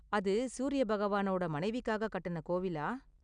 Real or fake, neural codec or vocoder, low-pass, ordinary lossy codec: real; none; 9.9 kHz; none